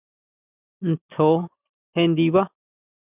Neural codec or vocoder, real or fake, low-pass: none; real; 3.6 kHz